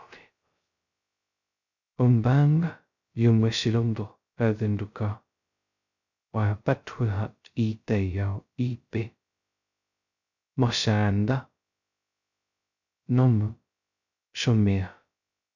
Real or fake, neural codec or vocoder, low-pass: fake; codec, 16 kHz, 0.2 kbps, FocalCodec; 7.2 kHz